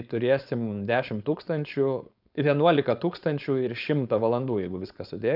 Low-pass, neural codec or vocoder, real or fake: 5.4 kHz; codec, 16 kHz, 4.8 kbps, FACodec; fake